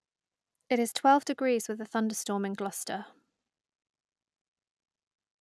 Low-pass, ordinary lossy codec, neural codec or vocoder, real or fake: none; none; none; real